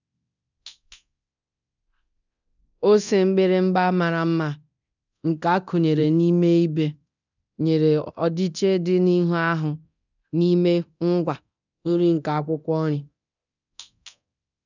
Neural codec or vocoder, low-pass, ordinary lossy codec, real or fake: codec, 24 kHz, 0.9 kbps, DualCodec; 7.2 kHz; none; fake